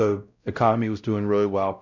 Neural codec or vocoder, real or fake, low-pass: codec, 16 kHz, 0.5 kbps, X-Codec, WavLM features, trained on Multilingual LibriSpeech; fake; 7.2 kHz